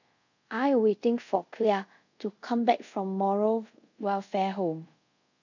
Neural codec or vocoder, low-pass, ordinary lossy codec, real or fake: codec, 24 kHz, 0.5 kbps, DualCodec; 7.2 kHz; none; fake